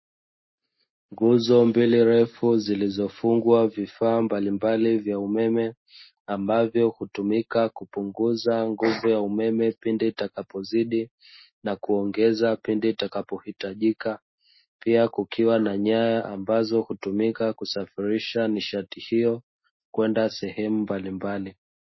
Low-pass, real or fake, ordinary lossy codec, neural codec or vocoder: 7.2 kHz; real; MP3, 24 kbps; none